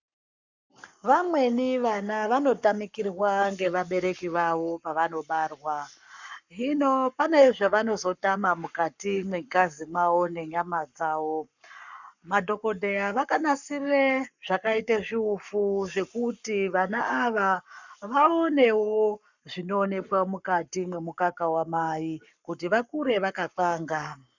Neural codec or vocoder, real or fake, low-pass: codec, 44.1 kHz, 7.8 kbps, Pupu-Codec; fake; 7.2 kHz